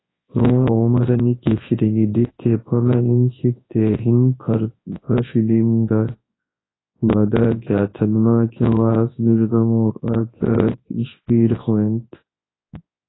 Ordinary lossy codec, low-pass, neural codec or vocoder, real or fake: AAC, 16 kbps; 7.2 kHz; codec, 24 kHz, 0.9 kbps, WavTokenizer, large speech release; fake